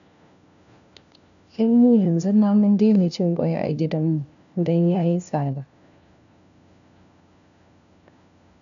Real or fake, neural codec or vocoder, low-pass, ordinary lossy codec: fake; codec, 16 kHz, 1 kbps, FunCodec, trained on LibriTTS, 50 frames a second; 7.2 kHz; none